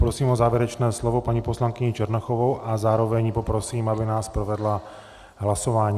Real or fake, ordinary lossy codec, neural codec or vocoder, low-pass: real; Opus, 64 kbps; none; 14.4 kHz